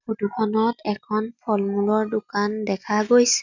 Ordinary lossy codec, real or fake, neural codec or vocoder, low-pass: none; real; none; 7.2 kHz